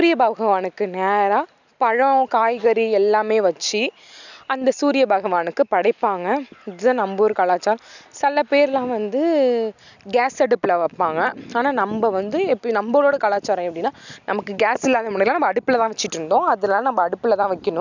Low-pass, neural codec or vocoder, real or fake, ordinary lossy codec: 7.2 kHz; none; real; none